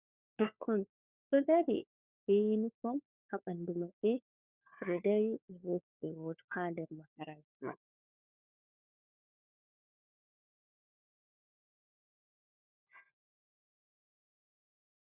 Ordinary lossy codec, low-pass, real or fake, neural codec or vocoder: Opus, 64 kbps; 3.6 kHz; fake; codec, 16 kHz, 4 kbps, FunCodec, trained on LibriTTS, 50 frames a second